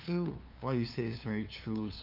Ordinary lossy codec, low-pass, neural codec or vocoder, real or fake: none; 5.4 kHz; codec, 16 kHz, 2 kbps, FunCodec, trained on LibriTTS, 25 frames a second; fake